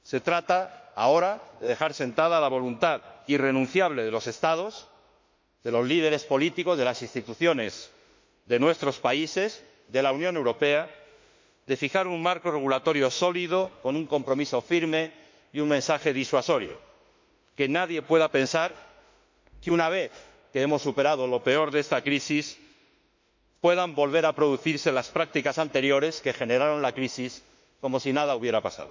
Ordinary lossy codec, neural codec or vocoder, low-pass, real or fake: MP3, 64 kbps; autoencoder, 48 kHz, 32 numbers a frame, DAC-VAE, trained on Japanese speech; 7.2 kHz; fake